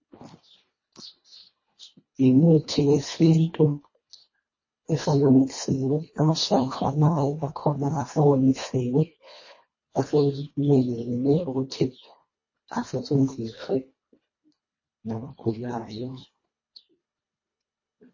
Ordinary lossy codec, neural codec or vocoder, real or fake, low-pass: MP3, 32 kbps; codec, 24 kHz, 1.5 kbps, HILCodec; fake; 7.2 kHz